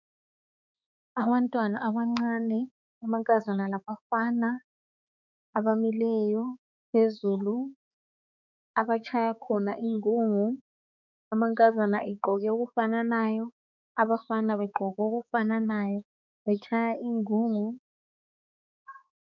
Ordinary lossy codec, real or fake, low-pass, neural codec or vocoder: MP3, 64 kbps; fake; 7.2 kHz; codec, 16 kHz, 4 kbps, X-Codec, HuBERT features, trained on balanced general audio